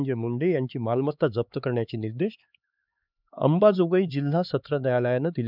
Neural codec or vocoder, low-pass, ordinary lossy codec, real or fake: codec, 16 kHz, 4 kbps, X-Codec, HuBERT features, trained on LibriSpeech; 5.4 kHz; none; fake